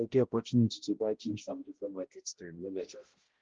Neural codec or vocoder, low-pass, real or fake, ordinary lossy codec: codec, 16 kHz, 0.5 kbps, X-Codec, HuBERT features, trained on balanced general audio; 7.2 kHz; fake; Opus, 16 kbps